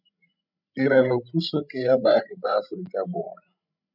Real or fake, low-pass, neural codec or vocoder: fake; 5.4 kHz; codec, 16 kHz, 16 kbps, FreqCodec, larger model